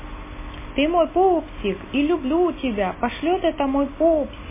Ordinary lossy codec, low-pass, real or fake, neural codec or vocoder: MP3, 16 kbps; 3.6 kHz; real; none